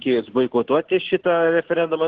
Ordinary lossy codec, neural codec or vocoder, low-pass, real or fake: Opus, 16 kbps; none; 7.2 kHz; real